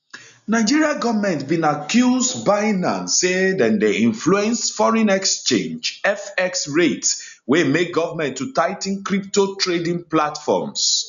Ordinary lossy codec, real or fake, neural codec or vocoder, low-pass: none; real; none; 7.2 kHz